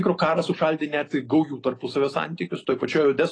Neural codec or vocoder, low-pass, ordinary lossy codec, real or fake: none; 9.9 kHz; AAC, 32 kbps; real